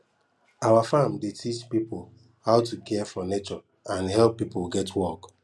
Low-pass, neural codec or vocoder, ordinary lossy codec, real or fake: none; none; none; real